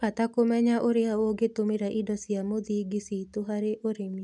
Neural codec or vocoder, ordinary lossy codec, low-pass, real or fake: none; none; 10.8 kHz; real